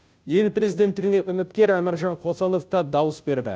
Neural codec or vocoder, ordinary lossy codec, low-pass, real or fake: codec, 16 kHz, 0.5 kbps, FunCodec, trained on Chinese and English, 25 frames a second; none; none; fake